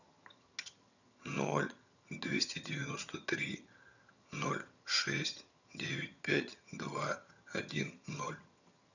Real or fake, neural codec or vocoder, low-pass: fake; vocoder, 22.05 kHz, 80 mel bands, HiFi-GAN; 7.2 kHz